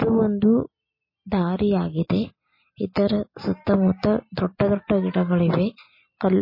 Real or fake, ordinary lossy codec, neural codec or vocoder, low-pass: real; MP3, 24 kbps; none; 5.4 kHz